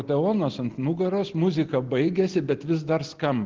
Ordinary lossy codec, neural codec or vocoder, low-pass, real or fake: Opus, 16 kbps; none; 7.2 kHz; real